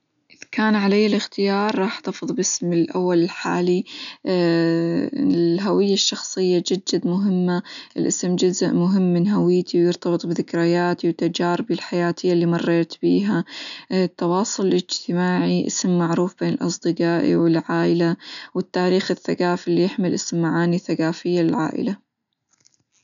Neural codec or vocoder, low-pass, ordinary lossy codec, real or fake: none; 7.2 kHz; MP3, 96 kbps; real